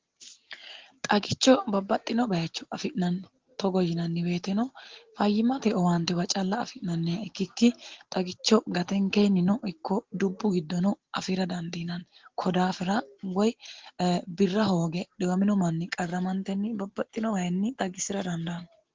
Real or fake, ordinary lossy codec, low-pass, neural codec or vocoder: real; Opus, 16 kbps; 7.2 kHz; none